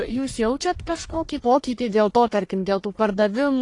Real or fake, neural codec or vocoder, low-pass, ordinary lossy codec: fake; codec, 44.1 kHz, 1.7 kbps, Pupu-Codec; 10.8 kHz; AAC, 48 kbps